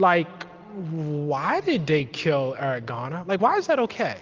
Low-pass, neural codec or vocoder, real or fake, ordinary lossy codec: 7.2 kHz; none; real; Opus, 32 kbps